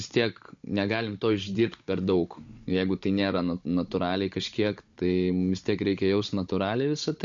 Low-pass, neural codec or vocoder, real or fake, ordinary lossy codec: 7.2 kHz; codec, 16 kHz, 16 kbps, FunCodec, trained on Chinese and English, 50 frames a second; fake; MP3, 48 kbps